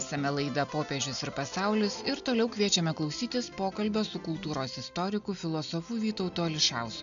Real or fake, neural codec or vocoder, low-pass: real; none; 7.2 kHz